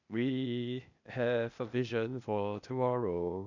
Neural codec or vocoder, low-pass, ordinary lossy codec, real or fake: codec, 16 kHz, 0.8 kbps, ZipCodec; 7.2 kHz; none; fake